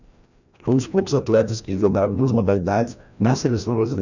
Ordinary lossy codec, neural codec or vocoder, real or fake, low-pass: none; codec, 16 kHz, 1 kbps, FreqCodec, larger model; fake; 7.2 kHz